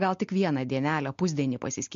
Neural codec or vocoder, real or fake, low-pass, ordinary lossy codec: none; real; 7.2 kHz; MP3, 48 kbps